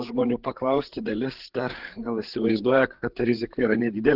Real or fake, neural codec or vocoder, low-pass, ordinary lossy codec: fake; codec, 16 kHz, 8 kbps, FreqCodec, larger model; 5.4 kHz; Opus, 16 kbps